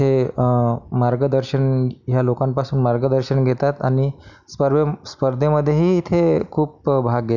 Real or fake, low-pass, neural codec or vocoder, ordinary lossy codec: real; 7.2 kHz; none; none